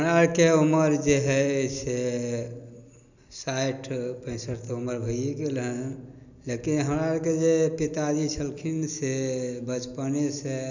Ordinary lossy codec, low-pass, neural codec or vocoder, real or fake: none; 7.2 kHz; none; real